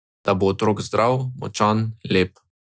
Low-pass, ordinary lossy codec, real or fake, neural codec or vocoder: none; none; real; none